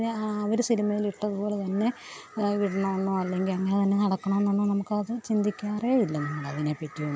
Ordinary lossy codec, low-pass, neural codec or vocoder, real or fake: none; none; none; real